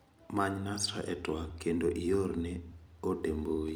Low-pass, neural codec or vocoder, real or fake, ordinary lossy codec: none; vocoder, 44.1 kHz, 128 mel bands every 256 samples, BigVGAN v2; fake; none